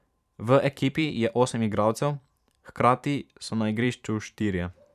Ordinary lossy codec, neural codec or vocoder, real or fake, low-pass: none; vocoder, 44.1 kHz, 128 mel bands every 512 samples, BigVGAN v2; fake; 14.4 kHz